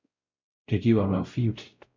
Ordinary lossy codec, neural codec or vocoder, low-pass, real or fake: MP3, 64 kbps; codec, 16 kHz, 0.5 kbps, X-Codec, WavLM features, trained on Multilingual LibriSpeech; 7.2 kHz; fake